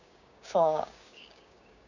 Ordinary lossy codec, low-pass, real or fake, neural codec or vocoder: none; 7.2 kHz; fake; codec, 16 kHz, 6 kbps, DAC